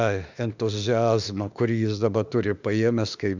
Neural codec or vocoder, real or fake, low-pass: autoencoder, 48 kHz, 32 numbers a frame, DAC-VAE, trained on Japanese speech; fake; 7.2 kHz